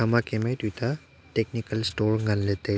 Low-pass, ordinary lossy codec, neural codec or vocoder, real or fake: none; none; none; real